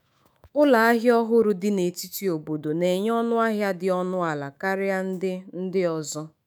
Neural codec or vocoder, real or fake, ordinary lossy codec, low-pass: autoencoder, 48 kHz, 128 numbers a frame, DAC-VAE, trained on Japanese speech; fake; none; none